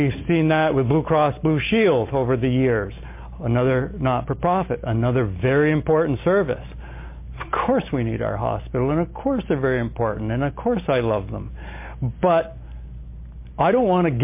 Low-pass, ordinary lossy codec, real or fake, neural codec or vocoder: 3.6 kHz; MP3, 24 kbps; real; none